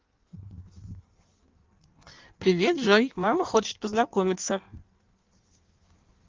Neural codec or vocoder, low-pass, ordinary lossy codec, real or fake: codec, 16 kHz in and 24 kHz out, 1.1 kbps, FireRedTTS-2 codec; 7.2 kHz; Opus, 32 kbps; fake